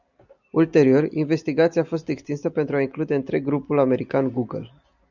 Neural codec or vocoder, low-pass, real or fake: none; 7.2 kHz; real